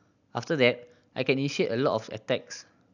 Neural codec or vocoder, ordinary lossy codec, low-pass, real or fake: none; none; 7.2 kHz; real